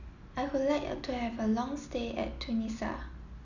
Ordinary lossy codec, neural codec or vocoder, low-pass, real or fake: none; none; 7.2 kHz; real